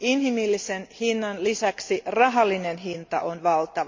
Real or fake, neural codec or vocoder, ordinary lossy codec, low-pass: real; none; MP3, 48 kbps; 7.2 kHz